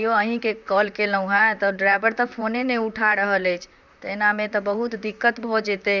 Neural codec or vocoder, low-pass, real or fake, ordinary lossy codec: vocoder, 44.1 kHz, 128 mel bands, Pupu-Vocoder; 7.2 kHz; fake; none